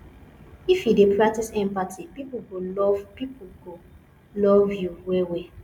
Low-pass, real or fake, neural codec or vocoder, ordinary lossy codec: 19.8 kHz; real; none; none